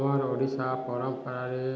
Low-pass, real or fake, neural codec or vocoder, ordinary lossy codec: none; real; none; none